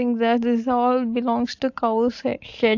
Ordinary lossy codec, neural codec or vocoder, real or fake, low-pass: AAC, 48 kbps; none; real; 7.2 kHz